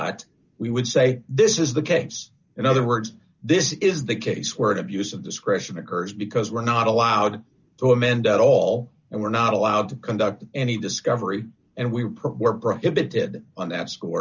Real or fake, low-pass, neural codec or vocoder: real; 7.2 kHz; none